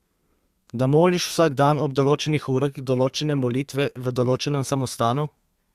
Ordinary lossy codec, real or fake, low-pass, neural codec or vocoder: Opus, 64 kbps; fake; 14.4 kHz; codec, 32 kHz, 1.9 kbps, SNAC